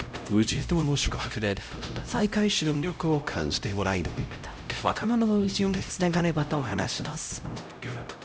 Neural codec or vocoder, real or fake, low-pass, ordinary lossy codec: codec, 16 kHz, 0.5 kbps, X-Codec, HuBERT features, trained on LibriSpeech; fake; none; none